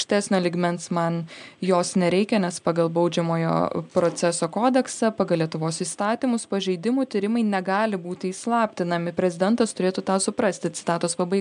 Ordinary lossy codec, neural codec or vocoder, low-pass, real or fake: MP3, 96 kbps; none; 9.9 kHz; real